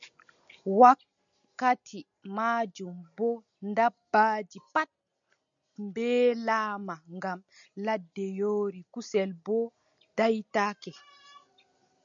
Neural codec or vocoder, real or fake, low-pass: none; real; 7.2 kHz